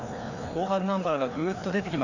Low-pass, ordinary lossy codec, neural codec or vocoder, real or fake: 7.2 kHz; none; codec, 16 kHz, 2 kbps, FreqCodec, larger model; fake